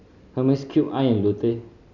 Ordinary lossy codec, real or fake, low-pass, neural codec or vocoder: none; real; 7.2 kHz; none